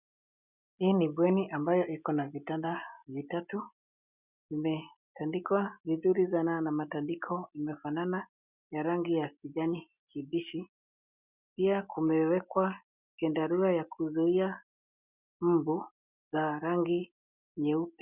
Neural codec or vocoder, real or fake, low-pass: none; real; 3.6 kHz